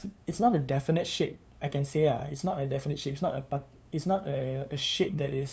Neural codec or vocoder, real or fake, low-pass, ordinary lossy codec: codec, 16 kHz, 2 kbps, FunCodec, trained on LibriTTS, 25 frames a second; fake; none; none